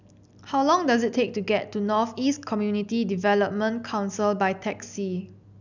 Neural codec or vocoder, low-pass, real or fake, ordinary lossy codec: none; 7.2 kHz; real; none